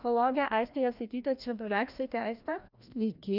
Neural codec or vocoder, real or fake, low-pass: codec, 16 kHz, 1 kbps, FreqCodec, larger model; fake; 5.4 kHz